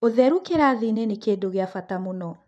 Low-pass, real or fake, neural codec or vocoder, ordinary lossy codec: none; fake; vocoder, 24 kHz, 100 mel bands, Vocos; none